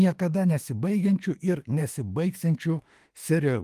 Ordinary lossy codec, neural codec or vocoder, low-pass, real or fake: Opus, 24 kbps; autoencoder, 48 kHz, 32 numbers a frame, DAC-VAE, trained on Japanese speech; 14.4 kHz; fake